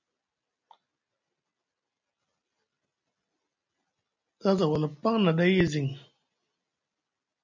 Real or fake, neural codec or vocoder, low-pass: real; none; 7.2 kHz